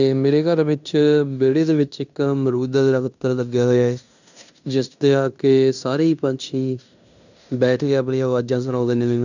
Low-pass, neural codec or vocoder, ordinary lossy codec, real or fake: 7.2 kHz; codec, 16 kHz in and 24 kHz out, 0.9 kbps, LongCat-Audio-Codec, fine tuned four codebook decoder; none; fake